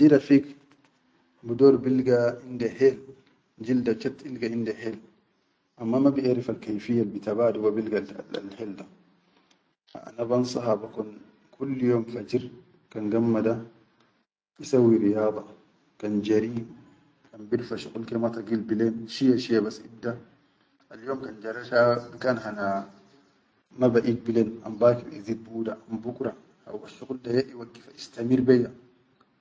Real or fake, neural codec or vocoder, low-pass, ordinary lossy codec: real; none; none; none